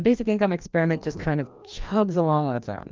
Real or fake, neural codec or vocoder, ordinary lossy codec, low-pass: fake; codec, 16 kHz, 1 kbps, FreqCodec, larger model; Opus, 24 kbps; 7.2 kHz